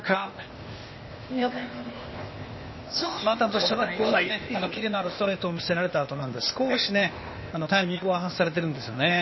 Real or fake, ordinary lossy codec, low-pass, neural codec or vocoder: fake; MP3, 24 kbps; 7.2 kHz; codec, 16 kHz, 0.8 kbps, ZipCodec